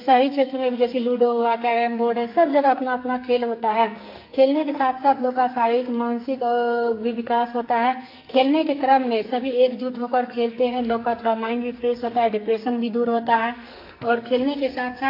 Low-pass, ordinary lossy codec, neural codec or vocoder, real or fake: 5.4 kHz; AAC, 32 kbps; codec, 44.1 kHz, 2.6 kbps, SNAC; fake